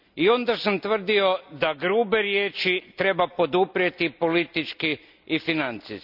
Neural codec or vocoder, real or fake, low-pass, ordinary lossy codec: none; real; 5.4 kHz; none